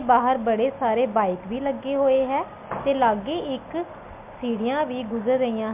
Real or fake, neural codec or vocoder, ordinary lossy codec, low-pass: real; none; MP3, 32 kbps; 3.6 kHz